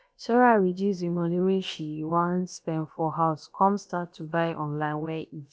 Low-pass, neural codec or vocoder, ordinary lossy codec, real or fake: none; codec, 16 kHz, about 1 kbps, DyCAST, with the encoder's durations; none; fake